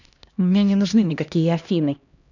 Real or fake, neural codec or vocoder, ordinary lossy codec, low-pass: fake; codec, 16 kHz, 1 kbps, X-Codec, HuBERT features, trained on LibriSpeech; none; 7.2 kHz